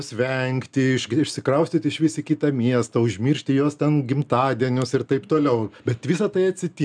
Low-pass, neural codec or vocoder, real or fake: 9.9 kHz; none; real